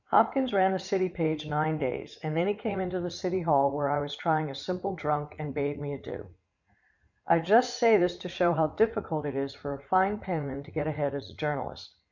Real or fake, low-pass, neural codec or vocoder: fake; 7.2 kHz; vocoder, 44.1 kHz, 80 mel bands, Vocos